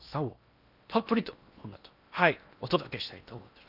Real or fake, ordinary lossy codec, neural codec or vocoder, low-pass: fake; none; codec, 16 kHz in and 24 kHz out, 0.8 kbps, FocalCodec, streaming, 65536 codes; 5.4 kHz